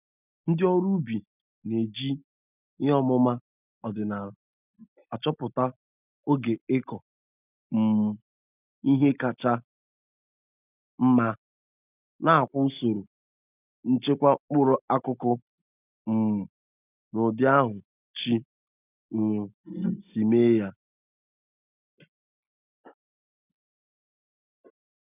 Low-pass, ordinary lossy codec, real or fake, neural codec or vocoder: 3.6 kHz; none; real; none